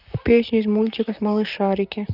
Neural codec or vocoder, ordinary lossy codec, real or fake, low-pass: vocoder, 44.1 kHz, 128 mel bands, Pupu-Vocoder; none; fake; 5.4 kHz